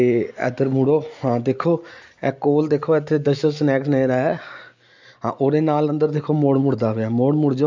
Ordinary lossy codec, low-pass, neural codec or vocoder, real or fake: MP3, 64 kbps; 7.2 kHz; none; real